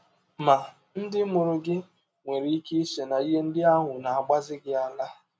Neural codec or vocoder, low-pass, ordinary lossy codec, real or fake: none; none; none; real